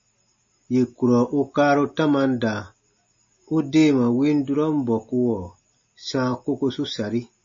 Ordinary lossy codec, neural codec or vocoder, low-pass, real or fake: MP3, 32 kbps; none; 7.2 kHz; real